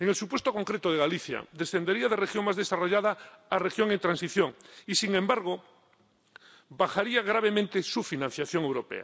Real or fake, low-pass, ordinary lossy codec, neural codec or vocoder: real; none; none; none